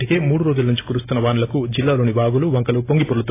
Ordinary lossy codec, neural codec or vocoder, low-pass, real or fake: AAC, 24 kbps; none; 3.6 kHz; real